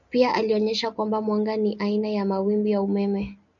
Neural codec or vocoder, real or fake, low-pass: none; real; 7.2 kHz